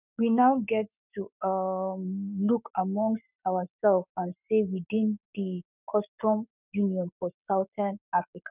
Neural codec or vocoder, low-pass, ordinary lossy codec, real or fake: codec, 44.1 kHz, 7.8 kbps, Pupu-Codec; 3.6 kHz; none; fake